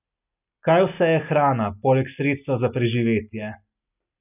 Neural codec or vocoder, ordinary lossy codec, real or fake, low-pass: none; none; real; 3.6 kHz